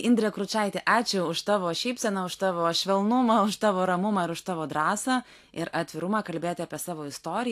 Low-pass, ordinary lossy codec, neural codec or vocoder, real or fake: 14.4 kHz; AAC, 64 kbps; none; real